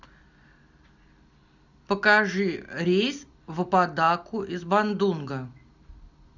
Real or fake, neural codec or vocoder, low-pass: real; none; 7.2 kHz